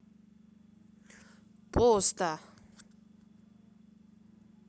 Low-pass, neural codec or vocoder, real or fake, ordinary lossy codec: none; none; real; none